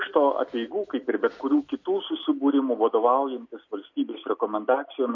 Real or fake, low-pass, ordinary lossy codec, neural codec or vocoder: real; 7.2 kHz; MP3, 48 kbps; none